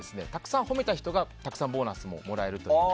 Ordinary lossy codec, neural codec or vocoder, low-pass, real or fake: none; none; none; real